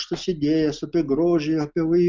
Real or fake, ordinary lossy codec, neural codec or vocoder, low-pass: real; Opus, 24 kbps; none; 7.2 kHz